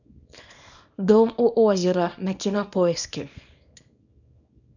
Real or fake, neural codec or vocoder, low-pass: fake; codec, 24 kHz, 0.9 kbps, WavTokenizer, small release; 7.2 kHz